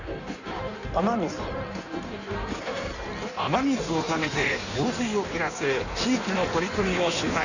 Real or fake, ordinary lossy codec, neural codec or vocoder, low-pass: fake; none; codec, 16 kHz in and 24 kHz out, 1.1 kbps, FireRedTTS-2 codec; 7.2 kHz